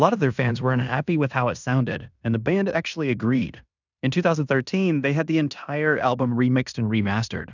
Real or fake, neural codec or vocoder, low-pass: fake; codec, 16 kHz in and 24 kHz out, 0.9 kbps, LongCat-Audio-Codec, fine tuned four codebook decoder; 7.2 kHz